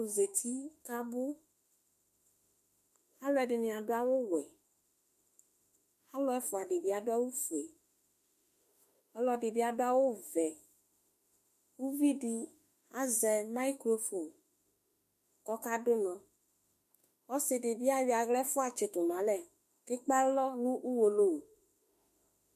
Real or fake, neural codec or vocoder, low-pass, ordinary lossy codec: fake; autoencoder, 48 kHz, 32 numbers a frame, DAC-VAE, trained on Japanese speech; 14.4 kHz; MP3, 64 kbps